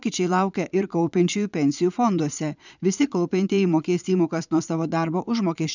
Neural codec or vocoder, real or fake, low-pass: vocoder, 22.05 kHz, 80 mel bands, Vocos; fake; 7.2 kHz